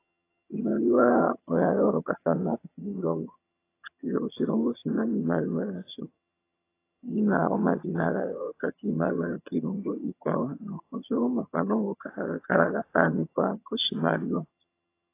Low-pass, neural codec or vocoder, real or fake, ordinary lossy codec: 3.6 kHz; vocoder, 22.05 kHz, 80 mel bands, HiFi-GAN; fake; AAC, 24 kbps